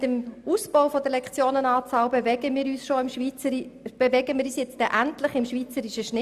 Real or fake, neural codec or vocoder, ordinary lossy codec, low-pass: real; none; Opus, 64 kbps; 14.4 kHz